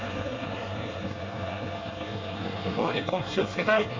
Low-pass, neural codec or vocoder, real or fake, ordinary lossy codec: 7.2 kHz; codec, 24 kHz, 1 kbps, SNAC; fake; MP3, 64 kbps